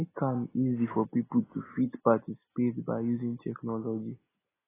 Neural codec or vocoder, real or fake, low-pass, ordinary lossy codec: none; real; 3.6 kHz; AAC, 16 kbps